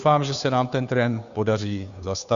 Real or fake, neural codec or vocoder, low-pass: fake; codec, 16 kHz, 2 kbps, FunCodec, trained on Chinese and English, 25 frames a second; 7.2 kHz